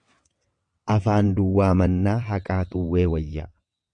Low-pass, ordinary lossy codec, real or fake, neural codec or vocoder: 9.9 kHz; MP3, 64 kbps; fake; vocoder, 22.05 kHz, 80 mel bands, WaveNeXt